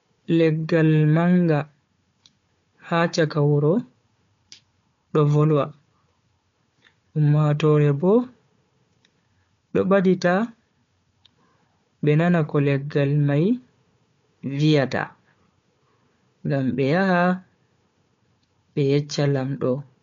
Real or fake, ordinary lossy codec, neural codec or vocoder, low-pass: fake; MP3, 48 kbps; codec, 16 kHz, 4 kbps, FunCodec, trained on Chinese and English, 50 frames a second; 7.2 kHz